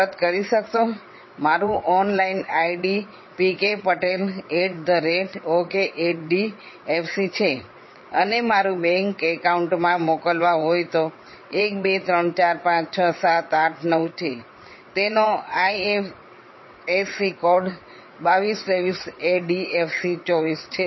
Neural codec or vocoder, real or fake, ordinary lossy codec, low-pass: vocoder, 22.05 kHz, 80 mel bands, WaveNeXt; fake; MP3, 24 kbps; 7.2 kHz